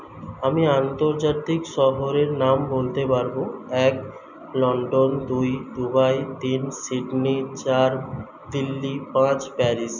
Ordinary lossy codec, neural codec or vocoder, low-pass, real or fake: none; none; 7.2 kHz; real